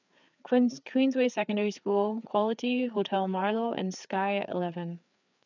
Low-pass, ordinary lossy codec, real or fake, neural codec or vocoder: 7.2 kHz; none; fake; codec, 16 kHz, 4 kbps, FreqCodec, larger model